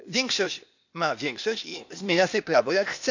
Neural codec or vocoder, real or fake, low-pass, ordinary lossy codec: codec, 16 kHz, 0.8 kbps, ZipCodec; fake; 7.2 kHz; none